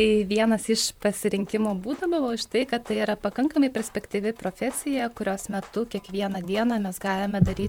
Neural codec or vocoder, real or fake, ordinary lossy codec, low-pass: vocoder, 44.1 kHz, 128 mel bands, Pupu-Vocoder; fake; MP3, 96 kbps; 19.8 kHz